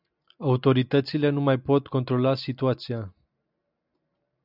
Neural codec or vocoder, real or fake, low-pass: none; real; 5.4 kHz